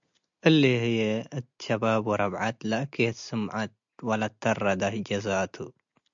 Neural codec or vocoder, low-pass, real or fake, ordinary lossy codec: none; 7.2 kHz; real; MP3, 64 kbps